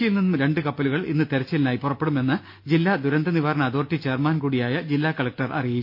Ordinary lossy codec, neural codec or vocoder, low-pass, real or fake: MP3, 32 kbps; none; 5.4 kHz; real